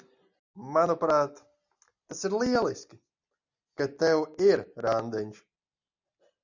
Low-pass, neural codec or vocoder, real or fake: 7.2 kHz; none; real